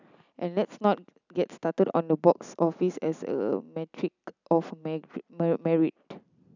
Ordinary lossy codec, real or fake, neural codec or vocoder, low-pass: none; real; none; 7.2 kHz